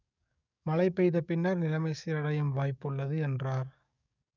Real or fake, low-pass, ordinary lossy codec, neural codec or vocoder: fake; 7.2 kHz; none; codec, 44.1 kHz, 7.8 kbps, DAC